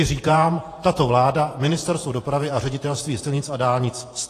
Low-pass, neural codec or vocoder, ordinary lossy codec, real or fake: 14.4 kHz; vocoder, 48 kHz, 128 mel bands, Vocos; AAC, 48 kbps; fake